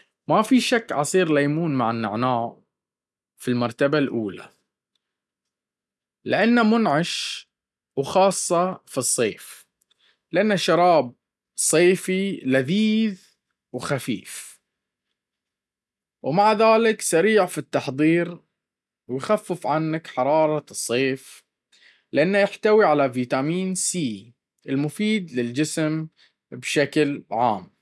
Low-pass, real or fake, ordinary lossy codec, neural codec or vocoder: none; real; none; none